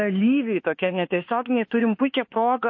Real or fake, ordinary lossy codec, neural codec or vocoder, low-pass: fake; MP3, 32 kbps; autoencoder, 48 kHz, 32 numbers a frame, DAC-VAE, trained on Japanese speech; 7.2 kHz